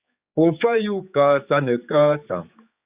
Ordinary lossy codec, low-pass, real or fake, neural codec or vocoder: Opus, 64 kbps; 3.6 kHz; fake; codec, 16 kHz, 4 kbps, X-Codec, HuBERT features, trained on general audio